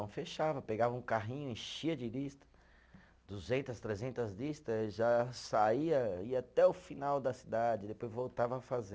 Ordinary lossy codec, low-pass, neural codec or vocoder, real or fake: none; none; none; real